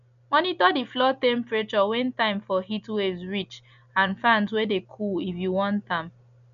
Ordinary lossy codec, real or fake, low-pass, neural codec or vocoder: none; real; 7.2 kHz; none